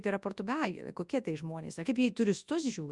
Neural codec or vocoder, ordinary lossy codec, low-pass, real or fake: codec, 24 kHz, 0.9 kbps, WavTokenizer, large speech release; Opus, 64 kbps; 10.8 kHz; fake